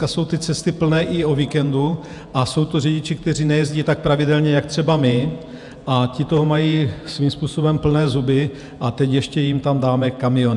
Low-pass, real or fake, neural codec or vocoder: 10.8 kHz; fake; vocoder, 48 kHz, 128 mel bands, Vocos